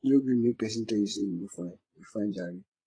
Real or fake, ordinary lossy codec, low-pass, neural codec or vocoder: fake; AAC, 32 kbps; 9.9 kHz; vocoder, 22.05 kHz, 80 mel bands, Vocos